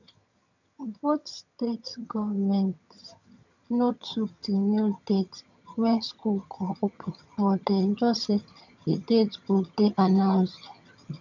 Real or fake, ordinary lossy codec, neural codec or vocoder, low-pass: fake; none; vocoder, 22.05 kHz, 80 mel bands, HiFi-GAN; 7.2 kHz